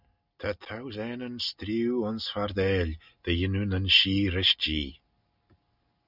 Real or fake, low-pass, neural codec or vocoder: real; 5.4 kHz; none